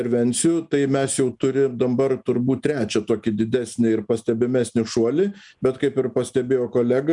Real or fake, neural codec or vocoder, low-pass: real; none; 10.8 kHz